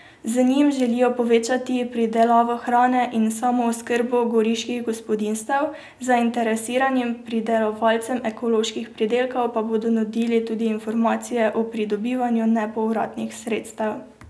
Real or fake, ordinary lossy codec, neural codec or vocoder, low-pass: real; none; none; none